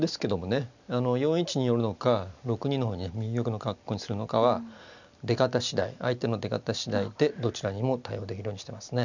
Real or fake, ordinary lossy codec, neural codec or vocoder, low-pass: real; none; none; 7.2 kHz